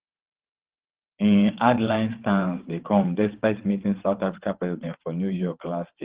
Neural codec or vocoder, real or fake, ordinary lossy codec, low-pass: vocoder, 22.05 kHz, 80 mel bands, WaveNeXt; fake; Opus, 16 kbps; 3.6 kHz